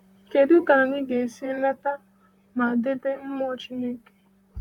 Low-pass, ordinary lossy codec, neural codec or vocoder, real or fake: 19.8 kHz; none; vocoder, 44.1 kHz, 128 mel bands, Pupu-Vocoder; fake